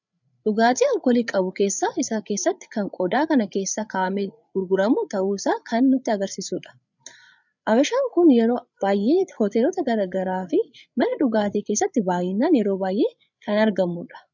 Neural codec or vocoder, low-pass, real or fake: codec, 16 kHz, 8 kbps, FreqCodec, larger model; 7.2 kHz; fake